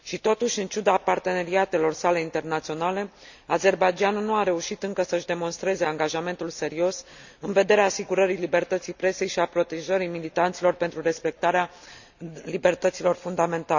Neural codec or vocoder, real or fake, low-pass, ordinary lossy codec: none; real; 7.2 kHz; none